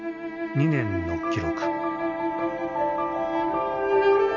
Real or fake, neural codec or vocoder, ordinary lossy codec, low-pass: real; none; none; 7.2 kHz